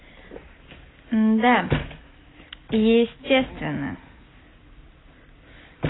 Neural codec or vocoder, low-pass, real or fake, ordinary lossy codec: none; 7.2 kHz; real; AAC, 16 kbps